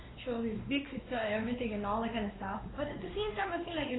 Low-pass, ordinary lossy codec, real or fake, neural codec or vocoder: 7.2 kHz; AAC, 16 kbps; fake; codec, 16 kHz, 2 kbps, X-Codec, WavLM features, trained on Multilingual LibriSpeech